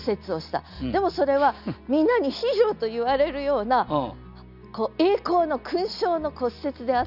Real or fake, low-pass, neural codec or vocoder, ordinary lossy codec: real; 5.4 kHz; none; none